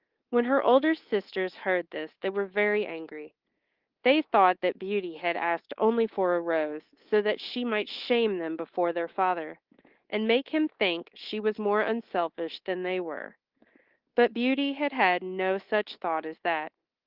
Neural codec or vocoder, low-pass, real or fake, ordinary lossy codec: codec, 24 kHz, 3.1 kbps, DualCodec; 5.4 kHz; fake; Opus, 16 kbps